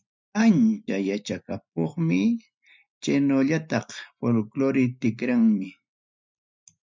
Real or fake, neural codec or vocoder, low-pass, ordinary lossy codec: real; none; 7.2 kHz; MP3, 64 kbps